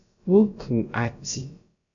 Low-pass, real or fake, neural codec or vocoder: 7.2 kHz; fake; codec, 16 kHz, about 1 kbps, DyCAST, with the encoder's durations